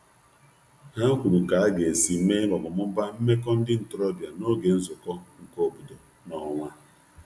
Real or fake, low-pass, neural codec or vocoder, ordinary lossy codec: real; none; none; none